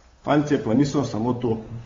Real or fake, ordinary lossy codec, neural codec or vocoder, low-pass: fake; AAC, 24 kbps; codec, 16 kHz, 8 kbps, FunCodec, trained on Chinese and English, 25 frames a second; 7.2 kHz